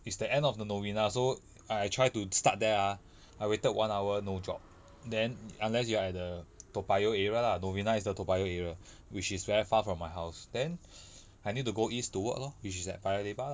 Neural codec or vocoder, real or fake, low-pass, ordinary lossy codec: none; real; none; none